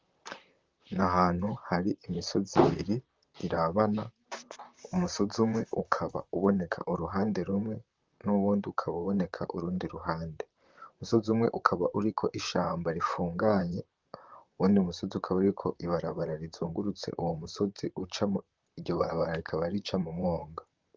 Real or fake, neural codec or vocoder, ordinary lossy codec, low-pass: fake; vocoder, 44.1 kHz, 128 mel bands, Pupu-Vocoder; Opus, 32 kbps; 7.2 kHz